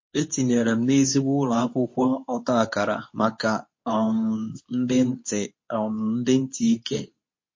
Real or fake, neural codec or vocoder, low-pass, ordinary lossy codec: fake; codec, 24 kHz, 0.9 kbps, WavTokenizer, medium speech release version 2; 7.2 kHz; MP3, 32 kbps